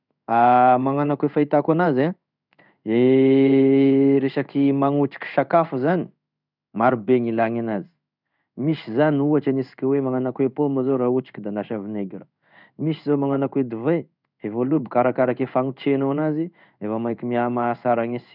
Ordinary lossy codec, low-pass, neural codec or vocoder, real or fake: none; 5.4 kHz; codec, 16 kHz in and 24 kHz out, 1 kbps, XY-Tokenizer; fake